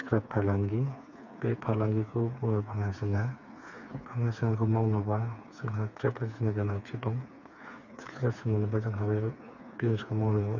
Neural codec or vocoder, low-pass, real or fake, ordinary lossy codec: codec, 16 kHz, 4 kbps, FreqCodec, smaller model; 7.2 kHz; fake; none